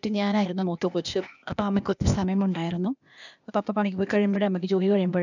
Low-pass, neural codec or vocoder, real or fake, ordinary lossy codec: 7.2 kHz; codec, 16 kHz, 0.8 kbps, ZipCodec; fake; none